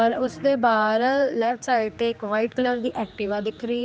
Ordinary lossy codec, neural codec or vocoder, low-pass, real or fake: none; codec, 16 kHz, 2 kbps, X-Codec, HuBERT features, trained on general audio; none; fake